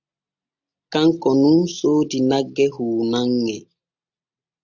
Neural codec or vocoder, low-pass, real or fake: none; 7.2 kHz; real